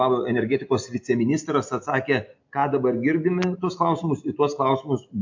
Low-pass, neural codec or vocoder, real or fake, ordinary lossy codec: 7.2 kHz; none; real; MP3, 48 kbps